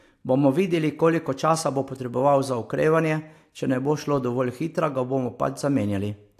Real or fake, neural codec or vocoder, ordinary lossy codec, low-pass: real; none; AAC, 64 kbps; 14.4 kHz